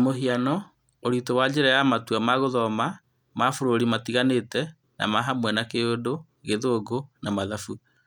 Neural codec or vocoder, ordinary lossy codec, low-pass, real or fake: none; none; 19.8 kHz; real